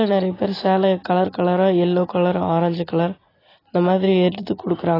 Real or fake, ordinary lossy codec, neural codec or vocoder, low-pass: real; AAC, 24 kbps; none; 5.4 kHz